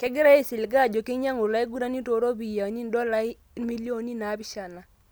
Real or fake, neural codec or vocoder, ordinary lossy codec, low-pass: real; none; none; none